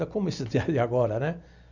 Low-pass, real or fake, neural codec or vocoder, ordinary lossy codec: 7.2 kHz; real; none; none